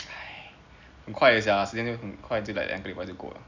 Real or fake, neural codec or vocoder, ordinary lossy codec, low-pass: real; none; none; 7.2 kHz